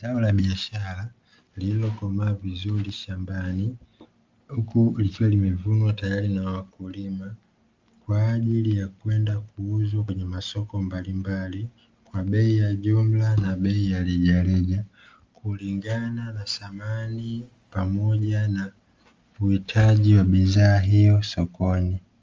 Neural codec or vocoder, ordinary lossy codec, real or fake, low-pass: none; Opus, 32 kbps; real; 7.2 kHz